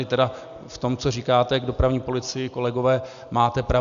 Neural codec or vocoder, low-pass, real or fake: none; 7.2 kHz; real